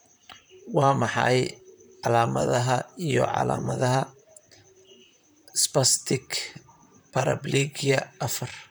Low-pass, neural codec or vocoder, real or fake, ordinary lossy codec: none; vocoder, 44.1 kHz, 128 mel bands, Pupu-Vocoder; fake; none